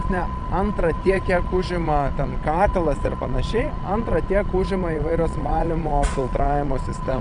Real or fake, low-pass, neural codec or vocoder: fake; 9.9 kHz; vocoder, 22.05 kHz, 80 mel bands, Vocos